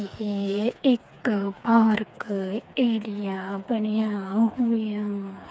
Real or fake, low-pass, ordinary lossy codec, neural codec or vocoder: fake; none; none; codec, 16 kHz, 4 kbps, FreqCodec, smaller model